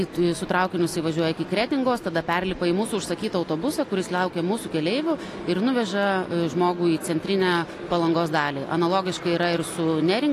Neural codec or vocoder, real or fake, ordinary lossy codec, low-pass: none; real; AAC, 48 kbps; 14.4 kHz